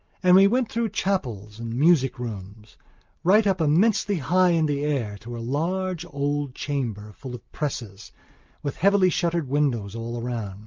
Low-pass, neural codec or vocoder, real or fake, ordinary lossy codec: 7.2 kHz; none; real; Opus, 24 kbps